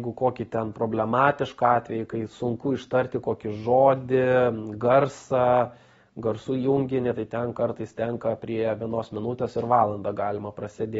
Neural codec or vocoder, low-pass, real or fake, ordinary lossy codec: none; 19.8 kHz; real; AAC, 24 kbps